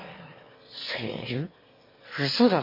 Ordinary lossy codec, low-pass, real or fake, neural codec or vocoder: MP3, 24 kbps; 5.4 kHz; fake; autoencoder, 22.05 kHz, a latent of 192 numbers a frame, VITS, trained on one speaker